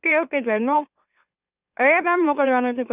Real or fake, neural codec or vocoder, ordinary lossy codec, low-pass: fake; autoencoder, 44.1 kHz, a latent of 192 numbers a frame, MeloTTS; none; 3.6 kHz